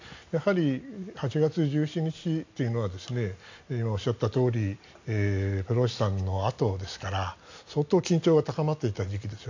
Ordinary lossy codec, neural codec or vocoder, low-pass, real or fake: AAC, 48 kbps; none; 7.2 kHz; real